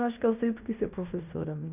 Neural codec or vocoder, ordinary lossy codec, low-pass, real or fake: codec, 16 kHz in and 24 kHz out, 0.9 kbps, LongCat-Audio-Codec, fine tuned four codebook decoder; none; 3.6 kHz; fake